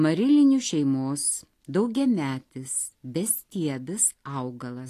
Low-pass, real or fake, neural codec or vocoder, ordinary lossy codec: 14.4 kHz; real; none; AAC, 48 kbps